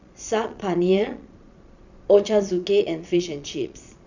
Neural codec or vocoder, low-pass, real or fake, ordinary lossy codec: vocoder, 22.05 kHz, 80 mel bands, Vocos; 7.2 kHz; fake; none